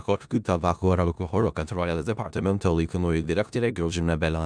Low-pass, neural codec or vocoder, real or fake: 9.9 kHz; codec, 16 kHz in and 24 kHz out, 0.4 kbps, LongCat-Audio-Codec, four codebook decoder; fake